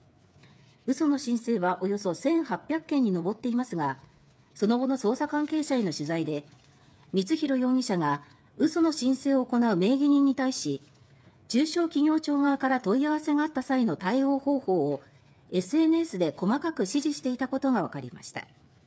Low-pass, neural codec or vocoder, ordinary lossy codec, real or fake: none; codec, 16 kHz, 8 kbps, FreqCodec, smaller model; none; fake